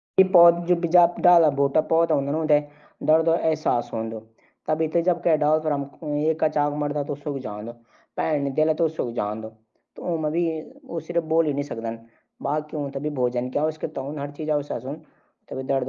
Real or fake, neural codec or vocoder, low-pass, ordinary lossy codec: real; none; 7.2 kHz; Opus, 32 kbps